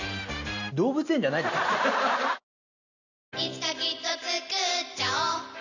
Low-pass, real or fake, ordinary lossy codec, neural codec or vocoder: 7.2 kHz; real; none; none